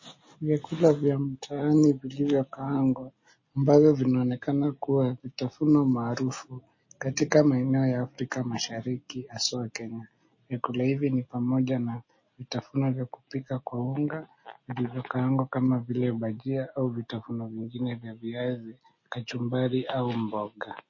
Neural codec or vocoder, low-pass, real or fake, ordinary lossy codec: none; 7.2 kHz; real; MP3, 32 kbps